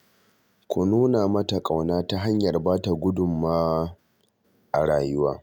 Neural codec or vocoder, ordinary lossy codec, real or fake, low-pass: none; none; real; none